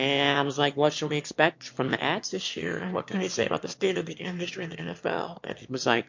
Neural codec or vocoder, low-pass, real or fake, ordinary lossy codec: autoencoder, 22.05 kHz, a latent of 192 numbers a frame, VITS, trained on one speaker; 7.2 kHz; fake; MP3, 48 kbps